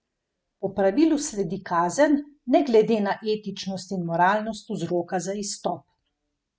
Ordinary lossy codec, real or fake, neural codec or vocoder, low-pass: none; real; none; none